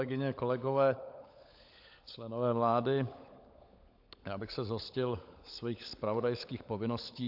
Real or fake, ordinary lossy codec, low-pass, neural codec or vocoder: fake; AAC, 48 kbps; 5.4 kHz; codec, 16 kHz, 16 kbps, FunCodec, trained on LibriTTS, 50 frames a second